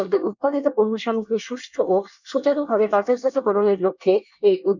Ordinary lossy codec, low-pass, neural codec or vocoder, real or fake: none; 7.2 kHz; codec, 24 kHz, 1 kbps, SNAC; fake